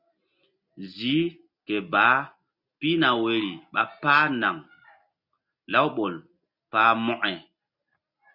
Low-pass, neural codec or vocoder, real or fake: 5.4 kHz; none; real